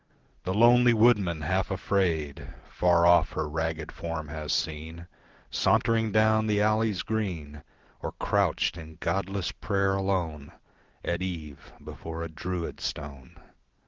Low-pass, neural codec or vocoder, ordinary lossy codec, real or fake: 7.2 kHz; none; Opus, 16 kbps; real